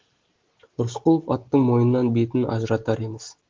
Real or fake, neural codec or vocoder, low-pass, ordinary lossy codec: real; none; 7.2 kHz; Opus, 16 kbps